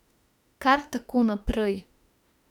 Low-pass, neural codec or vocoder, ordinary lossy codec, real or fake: 19.8 kHz; autoencoder, 48 kHz, 32 numbers a frame, DAC-VAE, trained on Japanese speech; none; fake